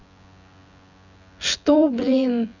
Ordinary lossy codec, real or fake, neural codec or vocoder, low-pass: none; fake; vocoder, 24 kHz, 100 mel bands, Vocos; 7.2 kHz